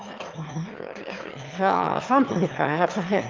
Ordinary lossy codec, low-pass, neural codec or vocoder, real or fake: Opus, 24 kbps; 7.2 kHz; autoencoder, 22.05 kHz, a latent of 192 numbers a frame, VITS, trained on one speaker; fake